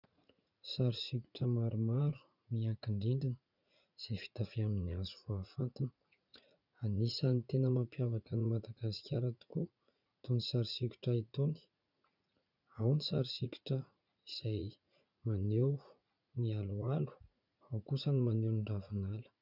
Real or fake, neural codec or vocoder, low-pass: fake; vocoder, 44.1 kHz, 80 mel bands, Vocos; 5.4 kHz